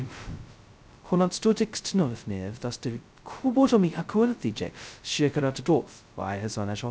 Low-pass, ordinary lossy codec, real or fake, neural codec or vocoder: none; none; fake; codec, 16 kHz, 0.2 kbps, FocalCodec